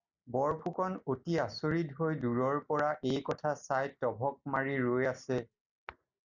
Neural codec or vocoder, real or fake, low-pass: none; real; 7.2 kHz